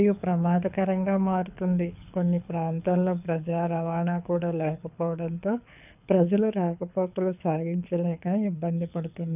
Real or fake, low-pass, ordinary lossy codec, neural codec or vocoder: fake; 3.6 kHz; none; codec, 24 kHz, 6 kbps, HILCodec